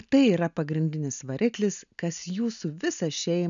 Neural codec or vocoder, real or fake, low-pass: none; real; 7.2 kHz